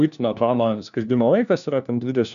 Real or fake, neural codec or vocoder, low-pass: fake; codec, 16 kHz, 1 kbps, FunCodec, trained on LibriTTS, 50 frames a second; 7.2 kHz